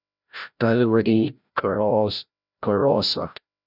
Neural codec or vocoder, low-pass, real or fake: codec, 16 kHz, 0.5 kbps, FreqCodec, larger model; 5.4 kHz; fake